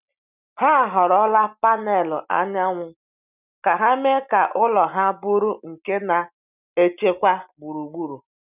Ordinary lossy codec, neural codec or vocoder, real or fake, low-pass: none; none; real; 3.6 kHz